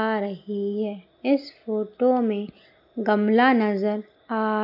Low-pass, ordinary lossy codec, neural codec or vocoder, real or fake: 5.4 kHz; none; none; real